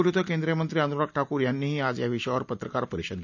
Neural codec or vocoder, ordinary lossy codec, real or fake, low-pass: none; none; real; 7.2 kHz